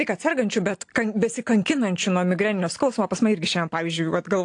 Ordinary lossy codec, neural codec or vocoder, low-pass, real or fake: AAC, 48 kbps; none; 9.9 kHz; real